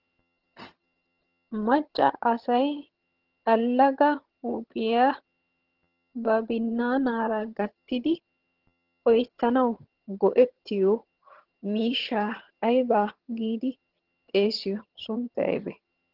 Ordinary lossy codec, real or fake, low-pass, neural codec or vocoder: Opus, 16 kbps; fake; 5.4 kHz; vocoder, 22.05 kHz, 80 mel bands, HiFi-GAN